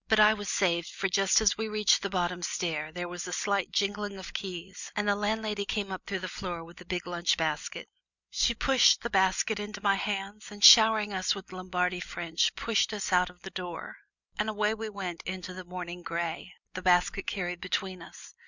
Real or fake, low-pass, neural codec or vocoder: real; 7.2 kHz; none